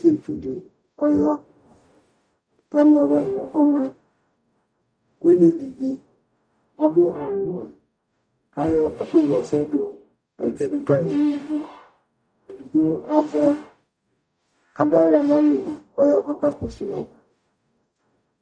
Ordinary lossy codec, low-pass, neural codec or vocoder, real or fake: MP3, 96 kbps; 9.9 kHz; codec, 44.1 kHz, 0.9 kbps, DAC; fake